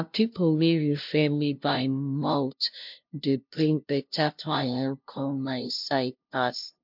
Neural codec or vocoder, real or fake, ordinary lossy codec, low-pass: codec, 16 kHz, 0.5 kbps, FunCodec, trained on LibriTTS, 25 frames a second; fake; MP3, 48 kbps; 5.4 kHz